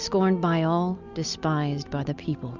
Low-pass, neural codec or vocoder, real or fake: 7.2 kHz; none; real